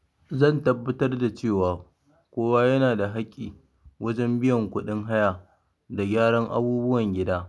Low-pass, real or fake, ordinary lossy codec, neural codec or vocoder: none; real; none; none